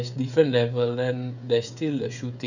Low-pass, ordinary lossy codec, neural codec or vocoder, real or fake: 7.2 kHz; none; codec, 16 kHz, 16 kbps, FreqCodec, smaller model; fake